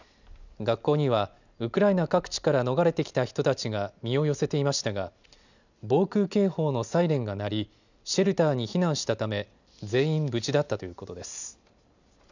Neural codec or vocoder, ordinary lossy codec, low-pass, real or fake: none; none; 7.2 kHz; real